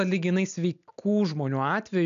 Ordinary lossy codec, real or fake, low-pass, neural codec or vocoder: MP3, 96 kbps; real; 7.2 kHz; none